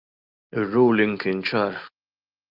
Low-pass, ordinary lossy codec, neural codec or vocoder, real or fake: 5.4 kHz; Opus, 24 kbps; none; real